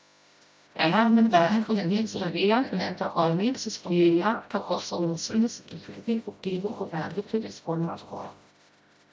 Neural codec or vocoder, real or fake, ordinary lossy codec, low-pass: codec, 16 kHz, 0.5 kbps, FreqCodec, smaller model; fake; none; none